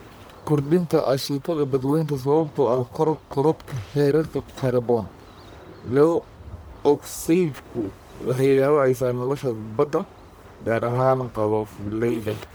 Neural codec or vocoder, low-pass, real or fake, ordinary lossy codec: codec, 44.1 kHz, 1.7 kbps, Pupu-Codec; none; fake; none